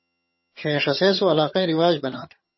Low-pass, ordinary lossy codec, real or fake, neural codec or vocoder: 7.2 kHz; MP3, 24 kbps; fake; vocoder, 22.05 kHz, 80 mel bands, HiFi-GAN